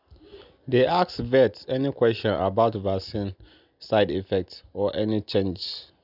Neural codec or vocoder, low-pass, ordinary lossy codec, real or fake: none; 5.4 kHz; none; real